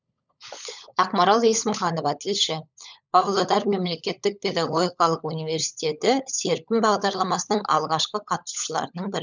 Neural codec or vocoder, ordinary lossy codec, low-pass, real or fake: codec, 16 kHz, 16 kbps, FunCodec, trained on LibriTTS, 50 frames a second; none; 7.2 kHz; fake